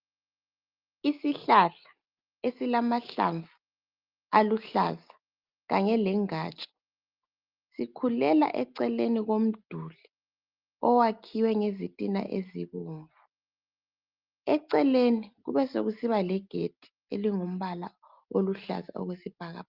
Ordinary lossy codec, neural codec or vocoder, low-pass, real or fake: Opus, 32 kbps; none; 5.4 kHz; real